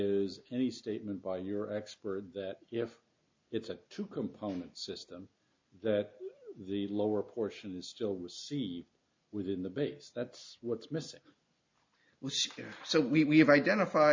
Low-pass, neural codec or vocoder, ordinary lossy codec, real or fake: 7.2 kHz; none; MP3, 48 kbps; real